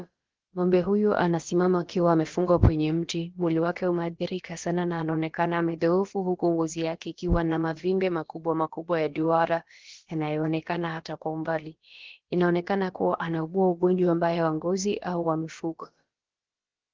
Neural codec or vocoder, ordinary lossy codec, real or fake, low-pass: codec, 16 kHz, about 1 kbps, DyCAST, with the encoder's durations; Opus, 16 kbps; fake; 7.2 kHz